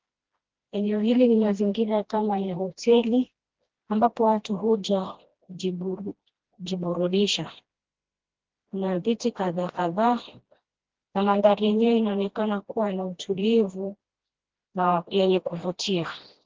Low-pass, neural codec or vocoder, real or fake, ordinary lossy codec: 7.2 kHz; codec, 16 kHz, 1 kbps, FreqCodec, smaller model; fake; Opus, 16 kbps